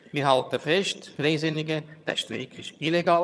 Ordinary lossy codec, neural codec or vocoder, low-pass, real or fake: none; vocoder, 22.05 kHz, 80 mel bands, HiFi-GAN; none; fake